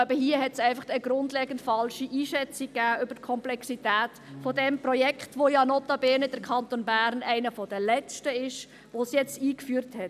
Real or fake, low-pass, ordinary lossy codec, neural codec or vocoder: real; 14.4 kHz; none; none